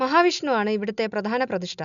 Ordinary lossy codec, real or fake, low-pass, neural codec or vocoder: none; real; 7.2 kHz; none